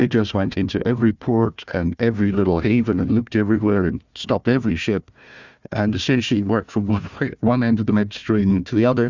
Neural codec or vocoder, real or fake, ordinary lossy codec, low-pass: codec, 16 kHz, 1 kbps, FunCodec, trained on Chinese and English, 50 frames a second; fake; Opus, 64 kbps; 7.2 kHz